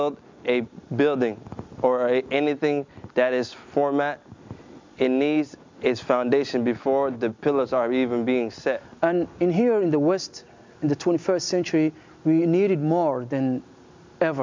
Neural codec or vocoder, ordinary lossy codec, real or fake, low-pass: none; MP3, 64 kbps; real; 7.2 kHz